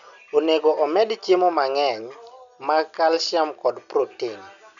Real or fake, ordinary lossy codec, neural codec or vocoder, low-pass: real; none; none; 7.2 kHz